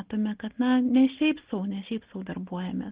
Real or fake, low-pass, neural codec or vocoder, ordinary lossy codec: real; 3.6 kHz; none; Opus, 16 kbps